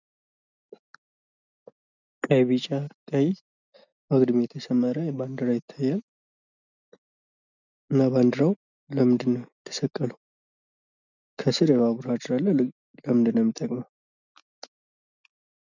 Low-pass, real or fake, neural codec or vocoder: 7.2 kHz; real; none